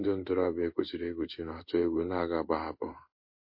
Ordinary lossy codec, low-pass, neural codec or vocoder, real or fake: MP3, 32 kbps; 5.4 kHz; codec, 16 kHz in and 24 kHz out, 1 kbps, XY-Tokenizer; fake